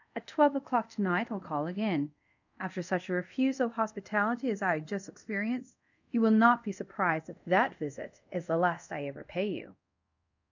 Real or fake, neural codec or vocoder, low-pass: fake; codec, 24 kHz, 0.5 kbps, DualCodec; 7.2 kHz